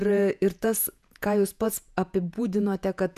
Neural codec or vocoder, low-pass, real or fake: vocoder, 48 kHz, 128 mel bands, Vocos; 14.4 kHz; fake